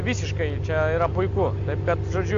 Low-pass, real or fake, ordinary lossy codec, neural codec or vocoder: 7.2 kHz; real; AAC, 48 kbps; none